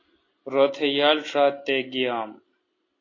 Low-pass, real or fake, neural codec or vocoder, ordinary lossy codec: 7.2 kHz; real; none; AAC, 32 kbps